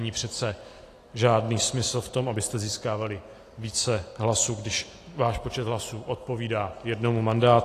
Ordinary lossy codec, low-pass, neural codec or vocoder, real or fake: AAC, 48 kbps; 14.4 kHz; none; real